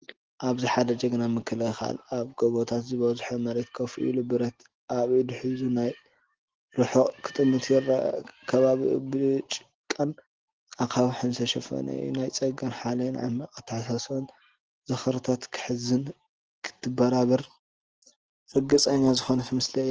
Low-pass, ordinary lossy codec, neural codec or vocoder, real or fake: 7.2 kHz; Opus, 16 kbps; none; real